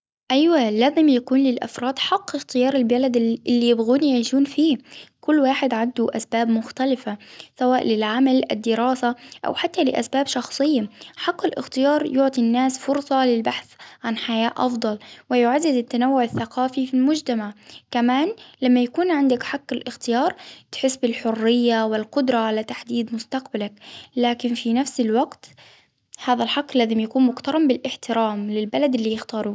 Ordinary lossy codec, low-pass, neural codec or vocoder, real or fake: none; none; none; real